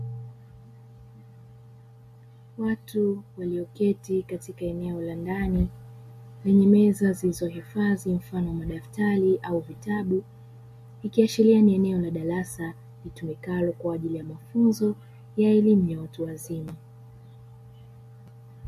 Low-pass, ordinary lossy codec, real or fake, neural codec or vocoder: 14.4 kHz; MP3, 64 kbps; real; none